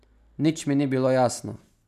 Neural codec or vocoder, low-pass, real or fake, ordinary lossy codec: none; 14.4 kHz; real; none